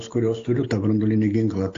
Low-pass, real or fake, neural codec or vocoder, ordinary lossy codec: 7.2 kHz; real; none; AAC, 48 kbps